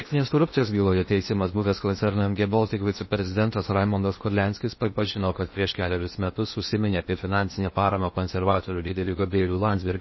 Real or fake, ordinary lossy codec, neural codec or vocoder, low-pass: fake; MP3, 24 kbps; codec, 16 kHz in and 24 kHz out, 0.8 kbps, FocalCodec, streaming, 65536 codes; 7.2 kHz